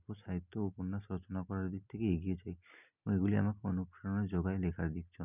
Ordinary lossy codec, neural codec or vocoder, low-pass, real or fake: none; none; 3.6 kHz; real